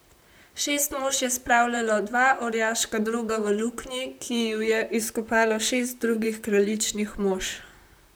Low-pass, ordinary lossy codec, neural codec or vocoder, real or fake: none; none; vocoder, 44.1 kHz, 128 mel bands, Pupu-Vocoder; fake